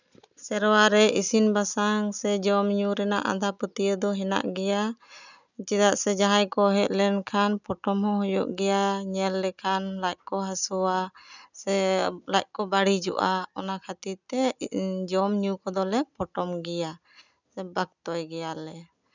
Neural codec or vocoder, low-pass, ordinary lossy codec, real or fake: none; 7.2 kHz; none; real